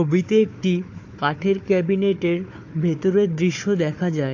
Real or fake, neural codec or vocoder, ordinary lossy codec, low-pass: fake; codec, 16 kHz, 4 kbps, FunCodec, trained on Chinese and English, 50 frames a second; AAC, 48 kbps; 7.2 kHz